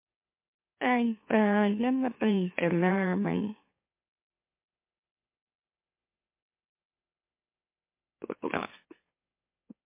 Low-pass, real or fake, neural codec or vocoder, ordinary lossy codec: 3.6 kHz; fake; autoencoder, 44.1 kHz, a latent of 192 numbers a frame, MeloTTS; MP3, 24 kbps